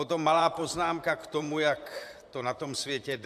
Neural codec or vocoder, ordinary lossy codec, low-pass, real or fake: vocoder, 44.1 kHz, 128 mel bands, Pupu-Vocoder; AAC, 96 kbps; 14.4 kHz; fake